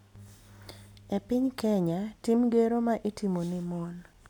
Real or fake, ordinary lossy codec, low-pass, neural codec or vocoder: real; none; 19.8 kHz; none